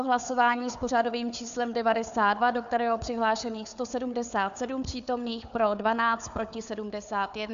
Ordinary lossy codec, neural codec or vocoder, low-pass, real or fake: AAC, 96 kbps; codec, 16 kHz, 4 kbps, FunCodec, trained on Chinese and English, 50 frames a second; 7.2 kHz; fake